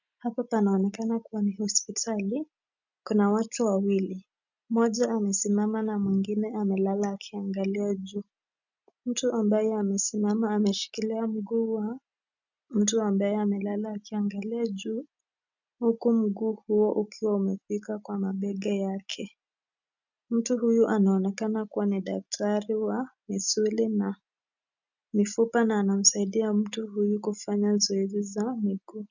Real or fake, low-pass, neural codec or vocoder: real; 7.2 kHz; none